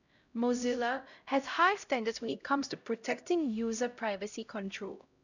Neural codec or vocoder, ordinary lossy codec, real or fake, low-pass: codec, 16 kHz, 0.5 kbps, X-Codec, HuBERT features, trained on LibriSpeech; none; fake; 7.2 kHz